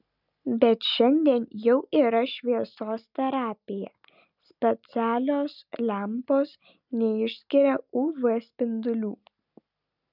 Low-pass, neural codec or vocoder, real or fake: 5.4 kHz; none; real